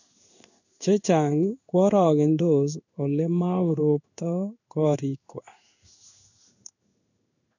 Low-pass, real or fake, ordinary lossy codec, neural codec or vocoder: 7.2 kHz; fake; none; codec, 16 kHz in and 24 kHz out, 1 kbps, XY-Tokenizer